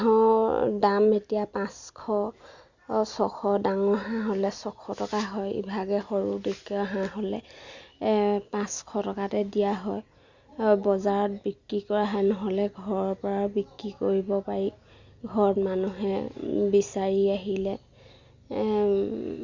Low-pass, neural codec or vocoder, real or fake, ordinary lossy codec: 7.2 kHz; none; real; Opus, 64 kbps